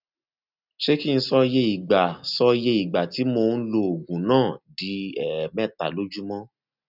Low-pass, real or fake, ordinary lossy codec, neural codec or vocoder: 5.4 kHz; real; none; none